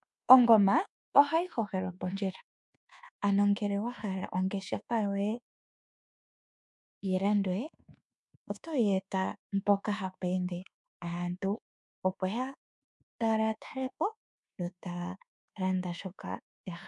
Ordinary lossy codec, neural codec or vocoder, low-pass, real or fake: MP3, 96 kbps; codec, 24 kHz, 1.2 kbps, DualCodec; 10.8 kHz; fake